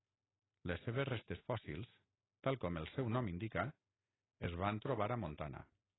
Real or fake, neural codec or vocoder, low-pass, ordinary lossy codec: real; none; 7.2 kHz; AAC, 16 kbps